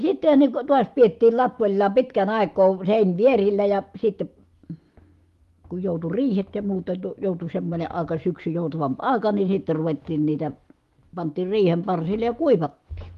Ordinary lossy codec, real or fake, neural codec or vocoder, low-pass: Opus, 24 kbps; fake; autoencoder, 48 kHz, 128 numbers a frame, DAC-VAE, trained on Japanese speech; 14.4 kHz